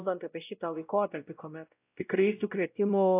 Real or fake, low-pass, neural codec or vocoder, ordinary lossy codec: fake; 3.6 kHz; codec, 16 kHz, 0.5 kbps, X-Codec, WavLM features, trained on Multilingual LibriSpeech; AAC, 32 kbps